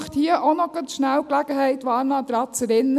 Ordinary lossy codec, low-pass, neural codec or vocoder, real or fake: none; 14.4 kHz; none; real